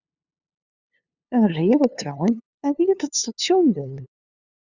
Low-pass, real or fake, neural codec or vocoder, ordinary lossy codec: 7.2 kHz; fake; codec, 16 kHz, 2 kbps, FunCodec, trained on LibriTTS, 25 frames a second; Opus, 64 kbps